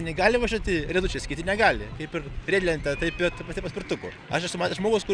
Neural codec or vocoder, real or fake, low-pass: none; real; 9.9 kHz